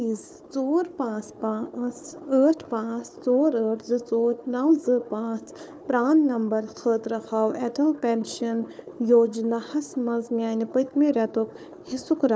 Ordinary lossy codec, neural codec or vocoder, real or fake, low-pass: none; codec, 16 kHz, 4 kbps, FunCodec, trained on Chinese and English, 50 frames a second; fake; none